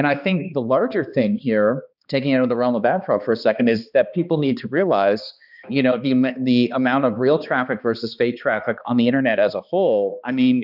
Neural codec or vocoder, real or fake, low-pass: codec, 16 kHz, 2 kbps, X-Codec, HuBERT features, trained on balanced general audio; fake; 5.4 kHz